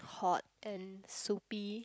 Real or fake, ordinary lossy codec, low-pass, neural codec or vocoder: real; none; none; none